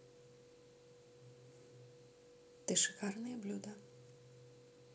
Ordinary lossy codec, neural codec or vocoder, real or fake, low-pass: none; none; real; none